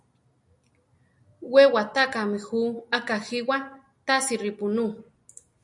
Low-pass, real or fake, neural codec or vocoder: 10.8 kHz; real; none